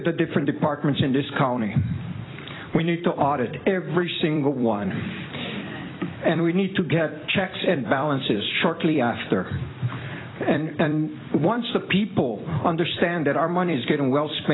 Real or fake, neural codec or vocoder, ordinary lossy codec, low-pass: fake; vocoder, 44.1 kHz, 128 mel bands every 256 samples, BigVGAN v2; AAC, 16 kbps; 7.2 kHz